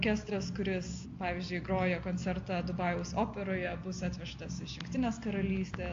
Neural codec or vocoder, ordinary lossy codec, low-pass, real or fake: none; AAC, 48 kbps; 7.2 kHz; real